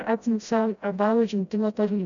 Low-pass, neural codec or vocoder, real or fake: 7.2 kHz; codec, 16 kHz, 0.5 kbps, FreqCodec, smaller model; fake